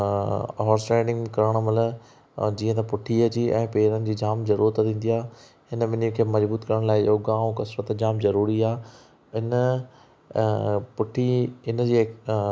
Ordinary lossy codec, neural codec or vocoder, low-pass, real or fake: none; none; none; real